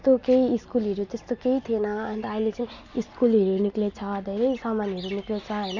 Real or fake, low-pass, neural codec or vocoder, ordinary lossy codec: real; 7.2 kHz; none; none